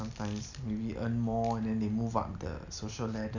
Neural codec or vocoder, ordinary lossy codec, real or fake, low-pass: none; none; real; 7.2 kHz